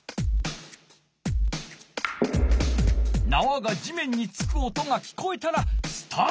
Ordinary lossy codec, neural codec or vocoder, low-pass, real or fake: none; none; none; real